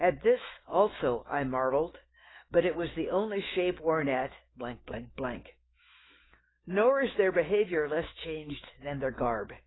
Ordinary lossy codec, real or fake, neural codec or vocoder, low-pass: AAC, 16 kbps; fake; autoencoder, 48 kHz, 128 numbers a frame, DAC-VAE, trained on Japanese speech; 7.2 kHz